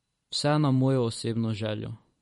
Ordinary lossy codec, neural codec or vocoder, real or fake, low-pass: MP3, 48 kbps; vocoder, 44.1 kHz, 128 mel bands every 512 samples, BigVGAN v2; fake; 19.8 kHz